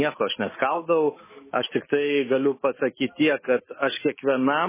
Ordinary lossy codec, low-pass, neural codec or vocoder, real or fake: MP3, 16 kbps; 3.6 kHz; autoencoder, 48 kHz, 128 numbers a frame, DAC-VAE, trained on Japanese speech; fake